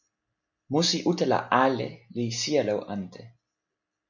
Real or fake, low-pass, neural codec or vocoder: real; 7.2 kHz; none